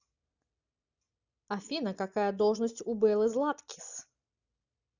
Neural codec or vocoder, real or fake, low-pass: none; real; 7.2 kHz